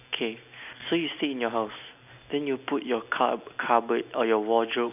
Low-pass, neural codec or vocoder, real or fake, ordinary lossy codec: 3.6 kHz; none; real; none